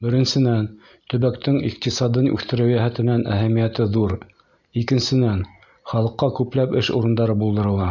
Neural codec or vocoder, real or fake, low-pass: none; real; 7.2 kHz